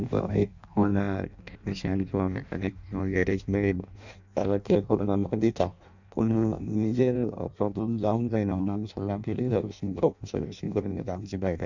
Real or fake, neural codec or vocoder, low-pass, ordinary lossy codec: fake; codec, 16 kHz in and 24 kHz out, 0.6 kbps, FireRedTTS-2 codec; 7.2 kHz; none